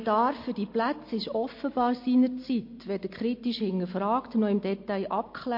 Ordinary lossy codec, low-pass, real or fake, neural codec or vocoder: MP3, 32 kbps; 5.4 kHz; real; none